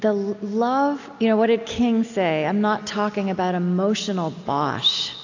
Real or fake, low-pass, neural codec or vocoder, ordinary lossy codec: real; 7.2 kHz; none; AAC, 48 kbps